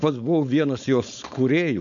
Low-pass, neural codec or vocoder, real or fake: 7.2 kHz; codec, 16 kHz, 4.8 kbps, FACodec; fake